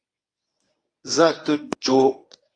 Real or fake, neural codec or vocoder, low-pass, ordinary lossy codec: fake; codec, 24 kHz, 0.9 kbps, WavTokenizer, medium speech release version 1; 9.9 kHz; AAC, 48 kbps